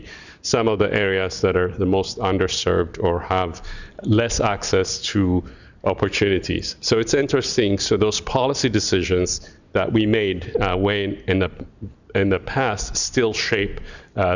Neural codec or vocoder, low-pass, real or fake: none; 7.2 kHz; real